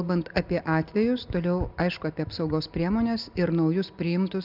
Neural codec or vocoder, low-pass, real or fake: none; 5.4 kHz; real